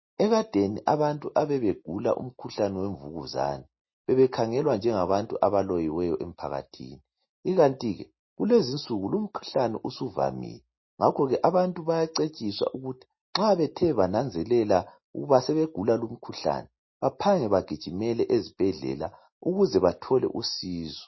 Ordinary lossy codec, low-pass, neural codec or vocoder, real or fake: MP3, 24 kbps; 7.2 kHz; none; real